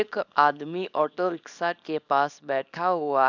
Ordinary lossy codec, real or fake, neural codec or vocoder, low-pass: none; fake; codec, 24 kHz, 0.9 kbps, WavTokenizer, small release; 7.2 kHz